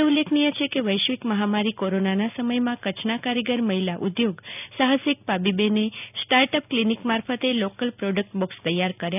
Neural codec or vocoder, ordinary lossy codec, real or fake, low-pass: none; none; real; 3.6 kHz